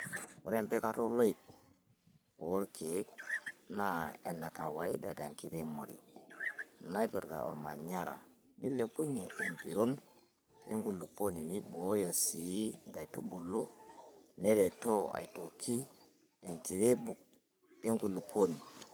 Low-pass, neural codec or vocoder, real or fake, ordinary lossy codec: none; codec, 44.1 kHz, 3.4 kbps, Pupu-Codec; fake; none